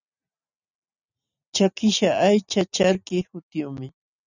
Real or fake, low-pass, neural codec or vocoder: real; 7.2 kHz; none